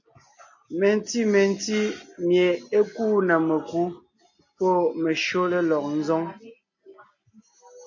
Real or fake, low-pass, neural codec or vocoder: real; 7.2 kHz; none